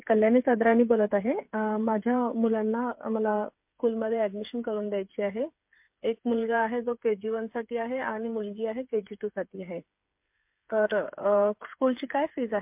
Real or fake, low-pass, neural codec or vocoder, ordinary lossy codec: fake; 3.6 kHz; codec, 16 kHz, 8 kbps, FreqCodec, smaller model; MP3, 32 kbps